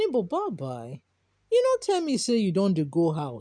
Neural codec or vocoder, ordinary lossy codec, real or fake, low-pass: none; none; real; 9.9 kHz